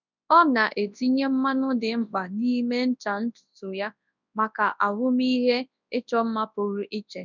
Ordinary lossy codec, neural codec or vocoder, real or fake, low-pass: none; codec, 24 kHz, 0.9 kbps, WavTokenizer, large speech release; fake; 7.2 kHz